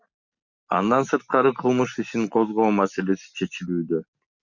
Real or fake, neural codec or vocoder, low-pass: real; none; 7.2 kHz